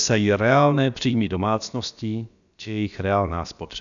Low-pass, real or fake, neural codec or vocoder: 7.2 kHz; fake; codec, 16 kHz, about 1 kbps, DyCAST, with the encoder's durations